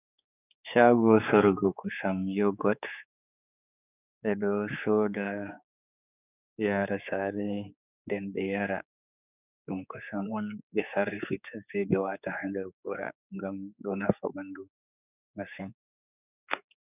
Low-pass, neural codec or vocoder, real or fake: 3.6 kHz; codec, 16 kHz, 4 kbps, X-Codec, HuBERT features, trained on general audio; fake